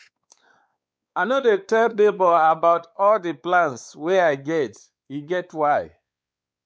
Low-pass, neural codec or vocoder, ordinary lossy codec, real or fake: none; codec, 16 kHz, 4 kbps, X-Codec, WavLM features, trained on Multilingual LibriSpeech; none; fake